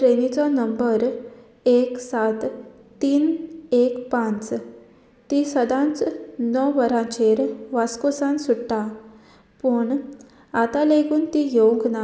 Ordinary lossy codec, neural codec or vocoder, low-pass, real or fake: none; none; none; real